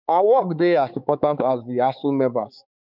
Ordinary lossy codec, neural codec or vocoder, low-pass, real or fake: none; codec, 16 kHz, 4 kbps, X-Codec, HuBERT features, trained on balanced general audio; 5.4 kHz; fake